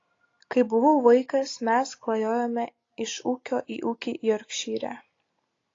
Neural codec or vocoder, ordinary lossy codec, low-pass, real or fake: none; AAC, 32 kbps; 7.2 kHz; real